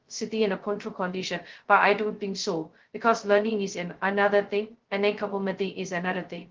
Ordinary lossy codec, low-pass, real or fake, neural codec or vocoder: Opus, 16 kbps; 7.2 kHz; fake; codec, 16 kHz, 0.2 kbps, FocalCodec